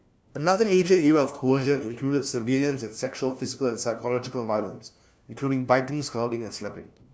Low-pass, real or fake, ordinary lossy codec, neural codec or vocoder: none; fake; none; codec, 16 kHz, 1 kbps, FunCodec, trained on LibriTTS, 50 frames a second